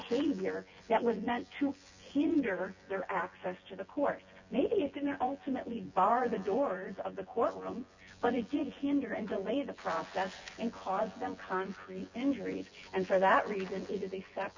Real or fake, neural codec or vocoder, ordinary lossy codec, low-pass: fake; vocoder, 24 kHz, 100 mel bands, Vocos; Opus, 64 kbps; 7.2 kHz